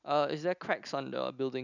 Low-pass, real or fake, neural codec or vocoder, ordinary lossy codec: 7.2 kHz; real; none; none